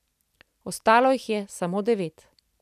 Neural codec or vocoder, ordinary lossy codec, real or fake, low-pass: none; none; real; 14.4 kHz